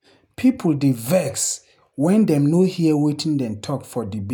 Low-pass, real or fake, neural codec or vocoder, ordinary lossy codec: none; real; none; none